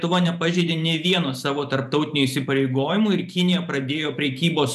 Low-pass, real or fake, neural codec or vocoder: 14.4 kHz; fake; vocoder, 44.1 kHz, 128 mel bands every 512 samples, BigVGAN v2